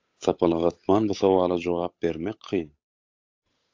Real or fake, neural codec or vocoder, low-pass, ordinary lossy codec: fake; codec, 16 kHz, 8 kbps, FunCodec, trained on Chinese and English, 25 frames a second; 7.2 kHz; AAC, 48 kbps